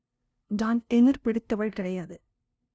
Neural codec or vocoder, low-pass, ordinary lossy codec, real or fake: codec, 16 kHz, 0.5 kbps, FunCodec, trained on LibriTTS, 25 frames a second; none; none; fake